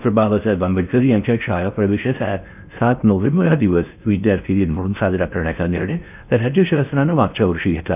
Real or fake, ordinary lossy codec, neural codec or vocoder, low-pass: fake; none; codec, 16 kHz in and 24 kHz out, 0.6 kbps, FocalCodec, streaming, 4096 codes; 3.6 kHz